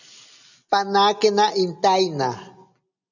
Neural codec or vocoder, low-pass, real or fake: none; 7.2 kHz; real